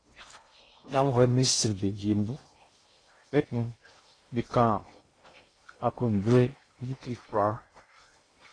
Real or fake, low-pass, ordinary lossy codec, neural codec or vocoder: fake; 9.9 kHz; AAC, 32 kbps; codec, 16 kHz in and 24 kHz out, 0.6 kbps, FocalCodec, streaming, 4096 codes